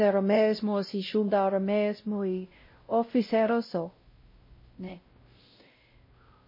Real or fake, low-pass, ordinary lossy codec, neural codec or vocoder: fake; 5.4 kHz; MP3, 24 kbps; codec, 16 kHz, 0.5 kbps, X-Codec, WavLM features, trained on Multilingual LibriSpeech